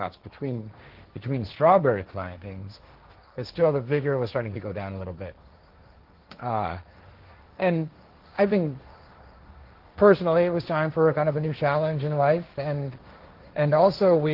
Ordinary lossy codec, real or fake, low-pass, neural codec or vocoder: Opus, 16 kbps; fake; 5.4 kHz; codec, 16 kHz, 1.1 kbps, Voila-Tokenizer